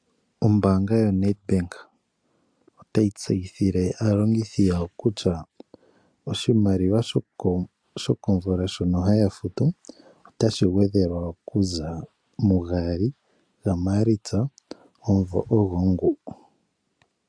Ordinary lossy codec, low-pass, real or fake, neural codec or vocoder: AAC, 64 kbps; 9.9 kHz; real; none